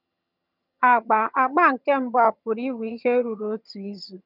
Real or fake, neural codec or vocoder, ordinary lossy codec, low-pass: fake; vocoder, 22.05 kHz, 80 mel bands, HiFi-GAN; none; 5.4 kHz